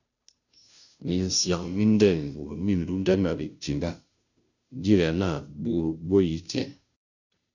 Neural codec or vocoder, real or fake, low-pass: codec, 16 kHz, 0.5 kbps, FunCodec, trained on Chinese and English, 25 frames a second; fake; 7.2 kHz